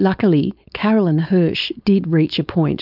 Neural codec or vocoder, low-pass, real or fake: codec, 16 kHz, 4.8 kbps, FACodec; 5.4 kHz; fake